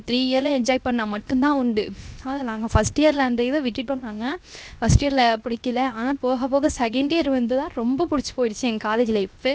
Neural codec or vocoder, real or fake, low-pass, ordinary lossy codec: codec, 16 kHz, 0.7 kbps, FocalCodec; fake; none; none